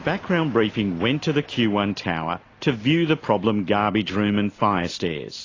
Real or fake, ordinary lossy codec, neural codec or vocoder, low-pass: real; AAC, 32 kbps; none; 7.2 kHz